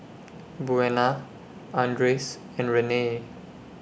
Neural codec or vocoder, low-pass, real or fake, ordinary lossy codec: none; none; real; none